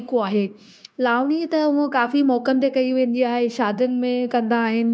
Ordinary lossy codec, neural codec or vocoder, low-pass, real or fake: none; codec, 16 kHz, 0.9 kbps, LongCat-Audio-Codec; none; fake